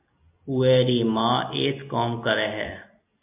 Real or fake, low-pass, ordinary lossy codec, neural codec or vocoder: real; 3.6 kHz; MP3, 32 kbps; none